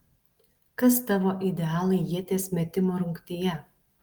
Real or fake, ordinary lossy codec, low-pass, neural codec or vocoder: real; Opus, 24 kbps; 19.8 kHz; none